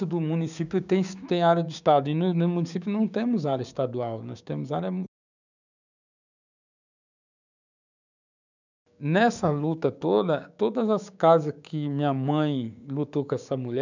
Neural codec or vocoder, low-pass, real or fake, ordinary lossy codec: codec, 16 kHz, 6 kbps, DAC; 7.2 kHz; fake; none